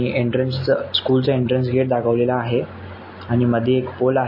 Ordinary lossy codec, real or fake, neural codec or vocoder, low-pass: MP3, 24 kbps; real; none; 5.4 kHz